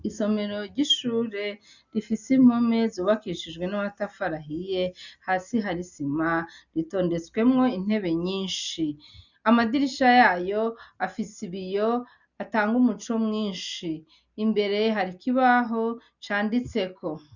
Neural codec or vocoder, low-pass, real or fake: none; 7.2 kHz; real